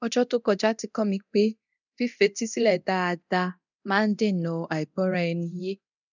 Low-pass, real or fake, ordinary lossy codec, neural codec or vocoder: 7.2 kHz; fake; none; codec, 24 kHz, 0.9 kbps, DualCodec